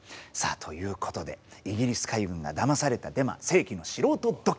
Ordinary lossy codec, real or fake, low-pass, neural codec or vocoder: none; real; none; none